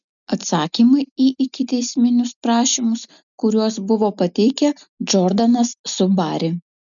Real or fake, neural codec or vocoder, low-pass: real; none; 7.2 kHz